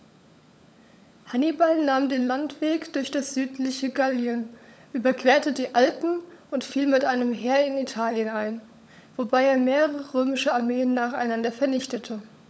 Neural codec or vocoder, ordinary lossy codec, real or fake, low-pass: codec, 16 kHz, 16 kbps, FunCodec, trained on LibriTTS, 50 frames a second; none; fake; none